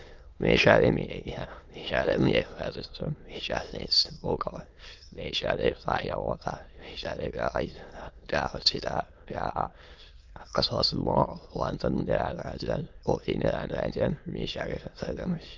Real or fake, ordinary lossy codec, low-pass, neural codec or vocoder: fake; Opus, 24 kbps; 7.2 kHz; autoencoder, 22.05 kHz, a latent of 192 numbers a frame, VITS, trained on many speakers